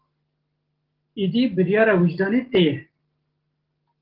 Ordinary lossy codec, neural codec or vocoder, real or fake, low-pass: Opus, 16 kbps; none; real; 5.4 kHz